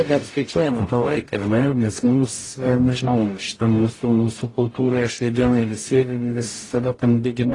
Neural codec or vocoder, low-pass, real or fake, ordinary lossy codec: codec, 44.1 kHz, 0.9 kbps, DAC; 10.8 kHz; fake; AAC, 32 kbps